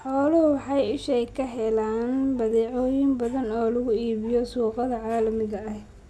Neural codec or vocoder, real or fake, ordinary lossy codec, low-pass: none; real; none; none